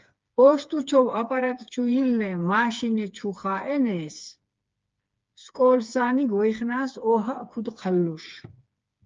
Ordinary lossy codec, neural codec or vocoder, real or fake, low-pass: Opus, 24 kbps; codec, 16 kHz, 4 kbps, FreqCodec, smaller model; fake; 7.2 kHz